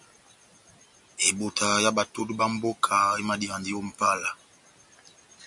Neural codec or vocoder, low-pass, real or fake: none; 10.8 kHz; real